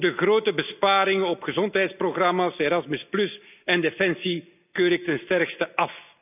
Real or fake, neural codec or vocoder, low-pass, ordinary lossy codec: real; none; 3.6 kHz; none